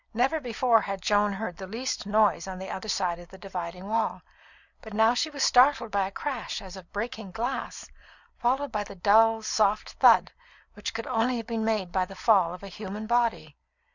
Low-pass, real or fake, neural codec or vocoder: 7.2 kHz; real; none